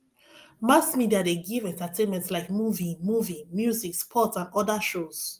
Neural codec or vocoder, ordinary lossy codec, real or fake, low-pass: none; Opus, 32 kbps; real; 14.4 kHz